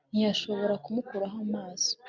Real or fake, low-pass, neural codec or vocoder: real; 7.2 kHz; none